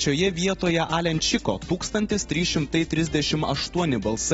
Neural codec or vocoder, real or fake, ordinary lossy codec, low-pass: none; real; AAC, 24 kbps; 19.8 kHz